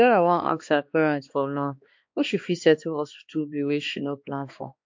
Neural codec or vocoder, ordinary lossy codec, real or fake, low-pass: codec, 16 kHz, 2 kbps, X-Codec, HuBERT features, trained on balanced general audio; MP3, 48 kbps; fake; 7.2 kHz